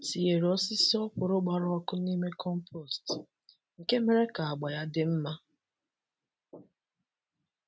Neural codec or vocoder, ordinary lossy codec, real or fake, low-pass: none; none; real; none